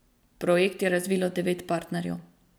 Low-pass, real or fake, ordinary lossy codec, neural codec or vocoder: none; fake; none; vocoder, 44.1 kHz, 128 mel bands every 256 samples, BigVGAN v2